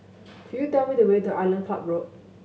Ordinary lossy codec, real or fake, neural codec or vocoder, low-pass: none; real; none; none